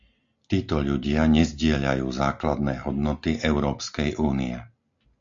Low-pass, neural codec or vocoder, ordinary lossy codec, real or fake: 7.2 kHz; none; AAC, 64 kbps; real